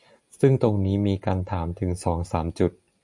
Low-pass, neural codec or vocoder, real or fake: 10.8 kHz; none; real